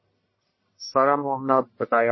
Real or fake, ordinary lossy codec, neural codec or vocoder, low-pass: fake; MP3, 24 kbps; codec, 44.1 kHz, 1.7 kbps, Pupu-Codec; 7.2 kHz